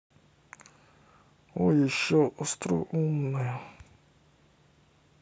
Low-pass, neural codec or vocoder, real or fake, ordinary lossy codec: none; none; real; none